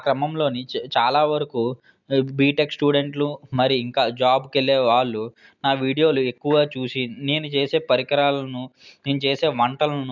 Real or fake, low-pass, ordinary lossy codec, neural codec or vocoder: real; 7.2 kHz; none; none